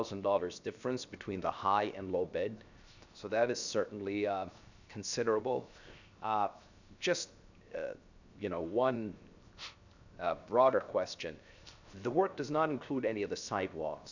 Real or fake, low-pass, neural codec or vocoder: fake; 7.2 kHz; codec, 16 kHz, 0.7 kbps, FocalCodec